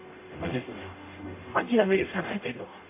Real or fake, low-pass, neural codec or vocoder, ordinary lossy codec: fake; 3.6 kHz; codec, 44.1 kHz, 0.9 kbps, DAC; MP3, 24 kbps